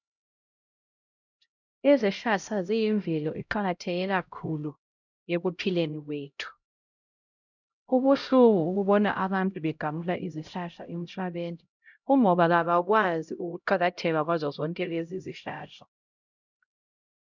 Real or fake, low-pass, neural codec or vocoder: fake; 7.2 kHz; codec, 16 kHz, 0.5 kbps, X-Codec, HuBERT features, trained on LibriSpeech